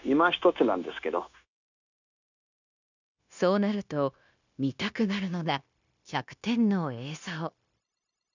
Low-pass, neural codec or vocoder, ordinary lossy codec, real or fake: 7.2 kHz; codec, 16 kHz, 0.9 kbps, LongCat-Audio-Codec; none; fake